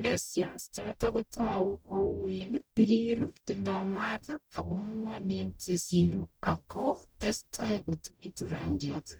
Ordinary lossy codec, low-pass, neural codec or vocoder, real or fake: none; none; codec, 44.1 kHz, 0.9 kbps, DAC; fake